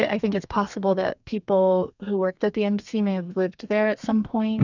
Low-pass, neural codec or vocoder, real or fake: 7.2 kHz; codec, 32 kHz, 1.9 kbps, SNAC; fake